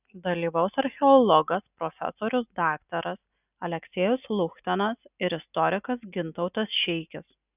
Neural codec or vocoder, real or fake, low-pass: none; real; 3.6 kHz